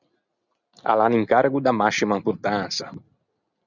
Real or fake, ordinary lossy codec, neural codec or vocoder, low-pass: real; Opus, 64 kbps; none; 7.2 kHz